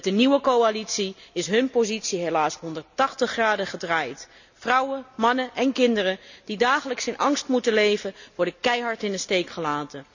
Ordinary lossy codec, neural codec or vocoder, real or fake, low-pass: none; none; real; 7.2 kHz